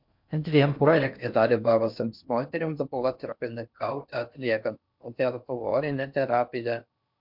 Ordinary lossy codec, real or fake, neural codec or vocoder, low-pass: MP3, 48 kbps; fake; codec, 16 kHz in and 24 kHz out, 0.6 kbps, FocalCodec, streaming, 4096 codes; 5.4 kHz